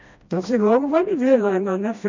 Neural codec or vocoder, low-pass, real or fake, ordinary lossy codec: codec, 16 kHz, 1 kbps, FreqCodec, smaller model; 7.2 kHz; fake; MP3, 64 kbps